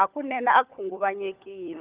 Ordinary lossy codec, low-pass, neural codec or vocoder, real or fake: Opus, 32 kbps; 3.6 kHz; codec, 16 kHz, 16 kbps, FunCodec, trained on LibriTTS, 50 frames a second; fake